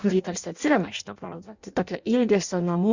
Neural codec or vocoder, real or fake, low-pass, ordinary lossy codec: codec, 16 kHz in and 24 kHz out, 0.6 kbps, FireRedTTS-2 codec; fake; 7.2 kHz; Opus, 64 kbps